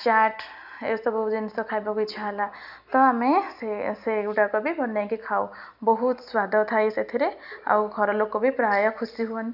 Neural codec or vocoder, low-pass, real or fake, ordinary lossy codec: none; 5.4 kHz; real; none